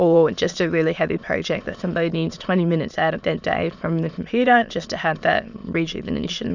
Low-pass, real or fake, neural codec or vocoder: 7.2 kHz; fake; autoencoder, 22.05 kHz, a latent of 192 numbers a frame, VITS, trained on many speakers